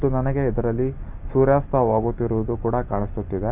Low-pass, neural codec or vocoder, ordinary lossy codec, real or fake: 3.6 kHz; none; Opus, 32 kbps; real